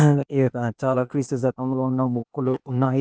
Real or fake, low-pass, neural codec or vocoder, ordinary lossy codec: fake; none; codec, 16 kHz, 0.8 kbps, ZipCodec; none